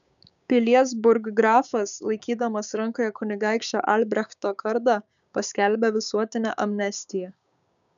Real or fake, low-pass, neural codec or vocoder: fake; 7.2 kHz; codec, 16 kHz, 6 kbps, DAC